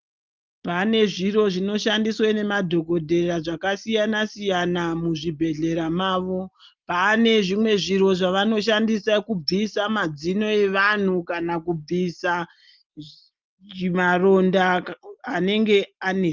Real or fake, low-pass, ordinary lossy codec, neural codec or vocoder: real; 7.2 kHz; Opus, 24 kbps; none